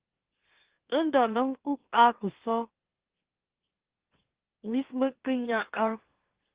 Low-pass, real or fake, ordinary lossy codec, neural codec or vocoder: 3.6 kHz; fake; Opus, 16 kbps; autoencoder, 44.1 kHz, a latent of 192 numbers a frame, MeloTTS